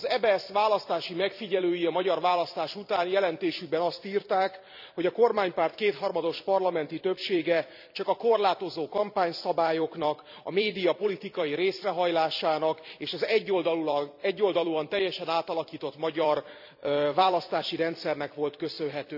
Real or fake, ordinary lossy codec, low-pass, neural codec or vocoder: fake; none; 5.4 kHz; vocoder, 44.1 kHz, 128 mel bands every 256 samples, BigVGAN v2